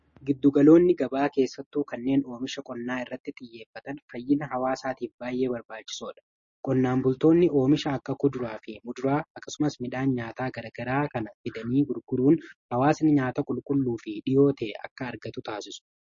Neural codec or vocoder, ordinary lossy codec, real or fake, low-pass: none; MP3, 32 kbps; real; 7.2 kHz